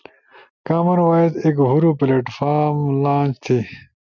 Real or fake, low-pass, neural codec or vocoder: real; 7.2 kHz; none